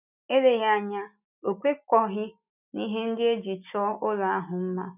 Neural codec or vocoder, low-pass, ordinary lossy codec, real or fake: none; 3.6 kHz; none; real